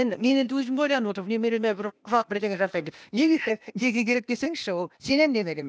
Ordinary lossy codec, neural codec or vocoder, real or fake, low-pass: none; codec, 16 kHz, 0.8 kbps, ZipCodec; fake; none